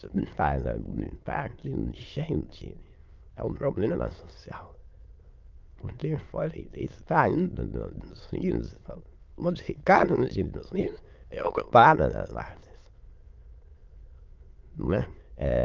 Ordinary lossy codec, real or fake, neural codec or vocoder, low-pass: Opus, 24 kbps; fake; autoencoder, 22.05 kHz, a latent of 192 numbers a frame, VITS, trained on many speakers; 7.2 kHz